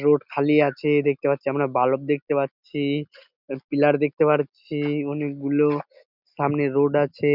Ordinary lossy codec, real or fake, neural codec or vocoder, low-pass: none; real; none; 5.4 kHz